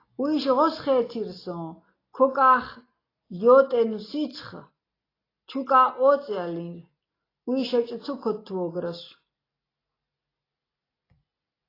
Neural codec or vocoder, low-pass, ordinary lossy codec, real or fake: none; 5.4 kHz; AAC, 24 kbps; real